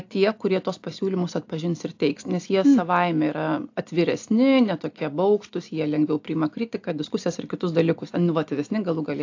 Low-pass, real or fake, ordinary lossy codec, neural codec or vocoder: 7.2 kHz; real; AAC, 48 kbps; none